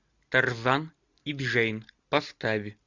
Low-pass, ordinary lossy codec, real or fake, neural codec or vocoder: 7.2 kHz; Opus, 64 kbps; real; none